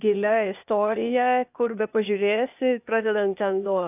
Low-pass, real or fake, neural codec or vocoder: 3.6 kHz; fake; codec, 16 kHz, 0.8 kbps, ZipCodec